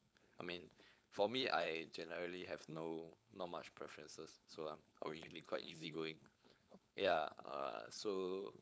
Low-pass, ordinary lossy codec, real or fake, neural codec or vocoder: none; none; fake; codec, 16 kHz, 4.8 kbps, FACodec